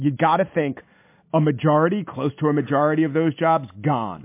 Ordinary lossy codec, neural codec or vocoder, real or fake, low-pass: MP3, 24 kbps; none; real; 3.6 kHz